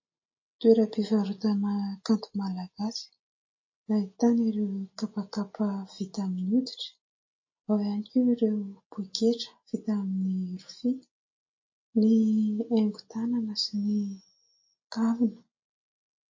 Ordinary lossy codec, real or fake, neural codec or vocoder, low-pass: MP3, 32 kbps; real; none; 7.2 kHz